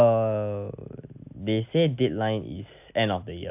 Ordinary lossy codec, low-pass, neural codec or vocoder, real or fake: AAC, 32 kbps; 3.6 kHz; none; real